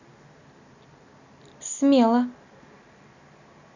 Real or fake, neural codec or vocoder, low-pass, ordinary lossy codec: real; none; 7.2 kHz; none